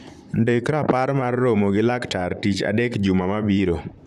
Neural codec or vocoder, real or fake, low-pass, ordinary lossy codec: vocoder, 44.1 kHz, 128 mel bands, Pupu-Vocoder; fake; 14.4 kHz; none